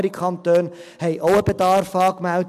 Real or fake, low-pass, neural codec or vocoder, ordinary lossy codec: real; 14.4 kHz; none; MP3, 96 kbps